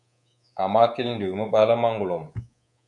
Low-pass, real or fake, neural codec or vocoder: 10.8 kHz; fake; codec, 24 kHz, 3.1 kbps, DualCodec